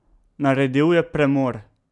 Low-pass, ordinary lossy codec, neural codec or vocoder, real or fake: 10.8 kHz; none; none; real